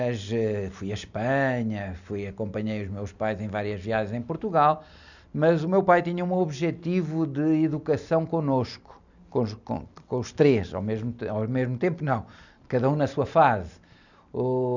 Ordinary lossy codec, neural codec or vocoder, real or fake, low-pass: none; none; real; 7.2 kHz